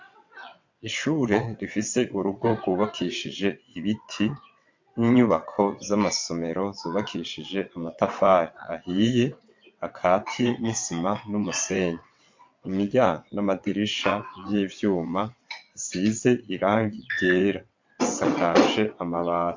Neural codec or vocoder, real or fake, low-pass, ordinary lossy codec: vocoder, 22.05 kHz, 80 mel bands, WaveNeXt; fake; 7.2 kHz; MP3, 48 kbps